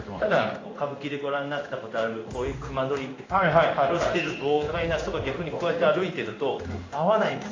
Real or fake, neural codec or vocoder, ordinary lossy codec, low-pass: fake; codec, 16 kHz in and 24 kHz out, 1 kbps, XY-Tokenizer; AAC, 32 kbps; 7.2 kHz